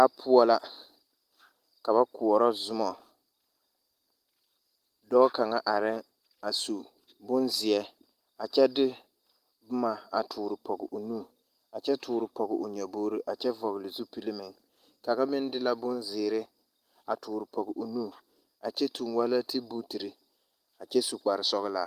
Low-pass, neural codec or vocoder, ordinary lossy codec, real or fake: 14.4 kHz; none; Opus, 24 kbps; real